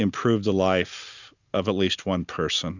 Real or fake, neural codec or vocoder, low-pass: real; none; 7.2 kHz